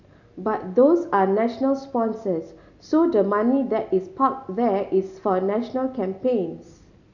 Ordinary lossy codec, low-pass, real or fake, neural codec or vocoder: none; 7.2 kHz; real; none